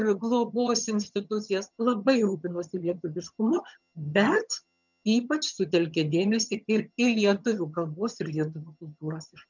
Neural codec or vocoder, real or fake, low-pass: vocoder, 22.05 kHz, 80 mel bands, HiFi-GAN; fake; 7.2 kHz